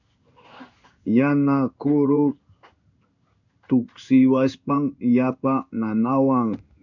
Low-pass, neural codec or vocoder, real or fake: 7.2 kHz; codec, 16 kHz in and 24 kHz out, 1 kbps, XY-Tokenizer; fake